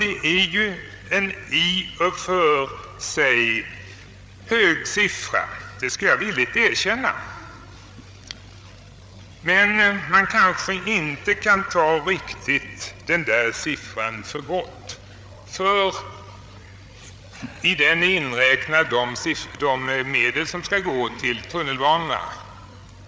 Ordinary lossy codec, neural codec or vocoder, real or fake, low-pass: none; codec, 16 kHz, 8 kbps, FreqCodec, larger model; fake; none